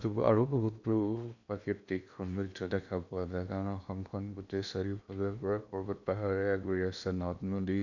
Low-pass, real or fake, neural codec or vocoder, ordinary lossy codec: 7.2 kHz; fake; codec, 16 kHz in and 24 kHz out, 0.8 kbps, FocalCodec, streaming, 65536 codes; none